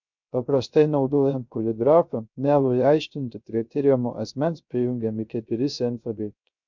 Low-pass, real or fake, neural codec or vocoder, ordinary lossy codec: 7.2 kHz; fake; codec, 16 kHz, 0.3 kbps, FocalCodec; MP3, 64 kbps